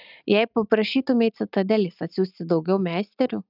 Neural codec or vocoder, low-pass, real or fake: none; 5.4 kHz; real